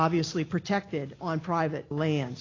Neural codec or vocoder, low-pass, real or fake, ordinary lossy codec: none; 7.2 kHz; real; AAC, 32 kbps